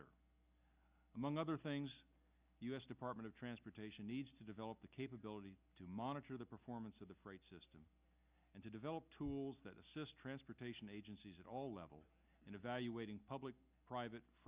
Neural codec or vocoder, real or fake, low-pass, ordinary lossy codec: none; real; 3.6 kHz; Opus, 64 kbps